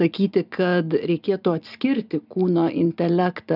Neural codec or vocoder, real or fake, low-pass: none; real; 5.4 kHz